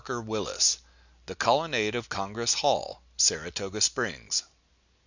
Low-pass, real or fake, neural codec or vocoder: 7.2 kHz; real; none